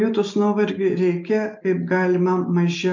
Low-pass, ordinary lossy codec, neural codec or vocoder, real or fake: 7.2 kHz; MP3, 64 kbps; none; real